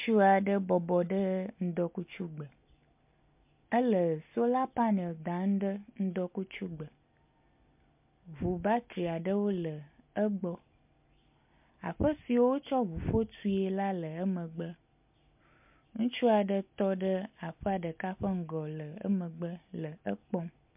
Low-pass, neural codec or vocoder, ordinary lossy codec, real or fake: 3.6 kHz; none; MP3, 32 kbps; real